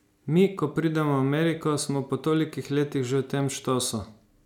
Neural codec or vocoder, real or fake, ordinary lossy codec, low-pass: none; real; none; 19.8 kHz